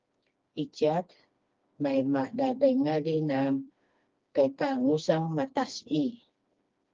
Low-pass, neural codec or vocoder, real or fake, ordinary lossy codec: 7.2 kHz; codec, 16 kHz, 2 kbps, FreqCodec, smaller model; fake; Opus, 32 kbps